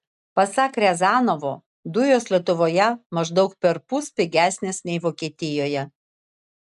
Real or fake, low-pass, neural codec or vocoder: real; 10.8 kHz; none